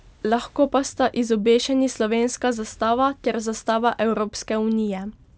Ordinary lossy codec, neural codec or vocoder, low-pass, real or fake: none; none; none; real